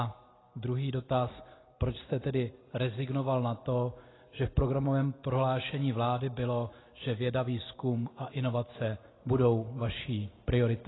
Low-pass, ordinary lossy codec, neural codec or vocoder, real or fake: 7.2 kHz; AAC, 16 kbps; none; real